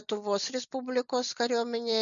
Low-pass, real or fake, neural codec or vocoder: 7.2 kHz; real; none